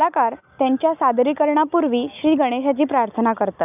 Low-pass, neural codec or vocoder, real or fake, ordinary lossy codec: 3.6 kHz; none; real; none